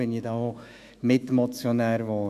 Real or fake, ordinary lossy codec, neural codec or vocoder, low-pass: fake; none; autoencoder, 48 kHz, 128 numbers a frame, DAC-VAE, trained on Japanese speech; 14.4 kHz